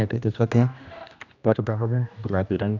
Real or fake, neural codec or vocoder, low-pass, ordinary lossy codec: fake; codec, 16 kHz, 1 kbps, X-Codec, HuBERT features, trained on general audio; 7.2 kHz; none